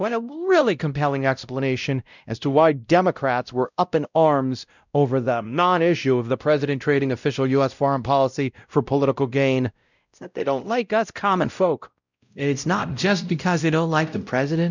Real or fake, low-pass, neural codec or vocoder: fake; 7.2 kHz; codec, 16 kHz, 0.5 kbps, X-Codec, WavLM features, trained on Multilingual LibriSpeech